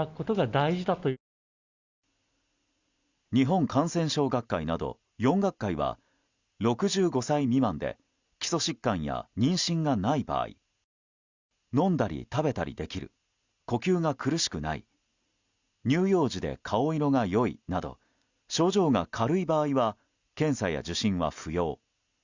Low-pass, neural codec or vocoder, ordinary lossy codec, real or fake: 7.2 kHz; none; Opus, 64 kbps; real